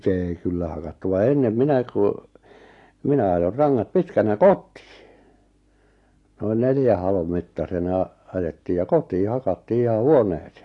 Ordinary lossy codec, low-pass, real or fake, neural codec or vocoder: AAC, 48 kbps; 10.8 kHz; real; none